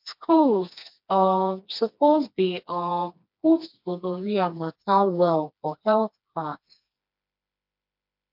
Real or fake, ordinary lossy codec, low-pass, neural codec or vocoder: fake; MP3, 48 kbps; 5.4 kHz; codec, 16 kHz, 2 kbps, FreqCodec, smaller model